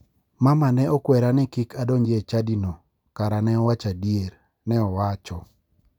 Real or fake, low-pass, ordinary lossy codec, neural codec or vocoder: real; 19.8 kHz; none; none